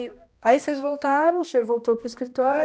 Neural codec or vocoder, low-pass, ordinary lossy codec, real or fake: codec, 16 kHz, 1 kbps, X-Codec, HuBERT features, trained on balanced general audio; none; none; fake